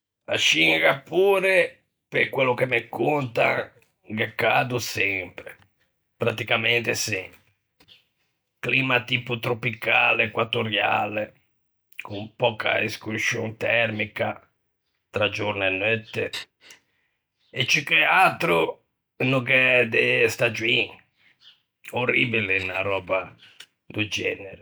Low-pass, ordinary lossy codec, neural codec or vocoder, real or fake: none; none; none; real